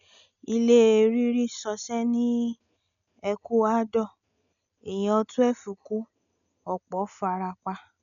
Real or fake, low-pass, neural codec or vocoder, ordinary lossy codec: real; 7.2 kHz; none; none